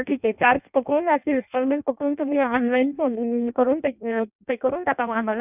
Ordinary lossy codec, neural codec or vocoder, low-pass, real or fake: none; codec, 16 kHz in and 24 kHz out, 0.6 kbps, FireRedTTS-2 codec; 3.6 kHz; fake